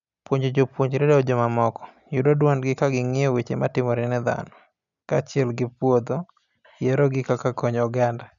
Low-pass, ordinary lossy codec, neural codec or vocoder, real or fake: 7.2 kHz; none; none; real